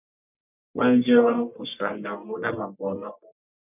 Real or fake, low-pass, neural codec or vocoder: fake; 3.6 kHz; codec, 44.1 kHz, 1.7 kbps, Pupu-Codec